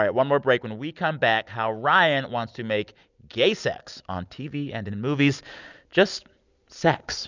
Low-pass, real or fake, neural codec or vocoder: 7.2 kHz; real; none